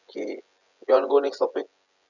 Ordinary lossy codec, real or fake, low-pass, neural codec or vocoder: none; fake; 7.2 kHz; vocoder, 24 kHz, 100 mel bands, Vocos